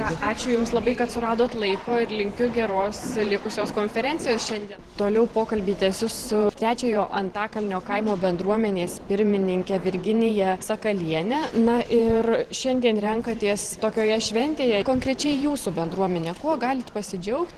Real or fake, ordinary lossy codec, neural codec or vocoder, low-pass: fake; Opus, 16 kbps; vocoder, 44.1 kHz, 128 mel bands every 512 samples, BigVGAN v2; 14.4 kHz